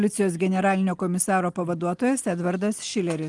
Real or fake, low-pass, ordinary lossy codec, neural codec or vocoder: real; 10.8 kHz; Opus, 24 kbps; none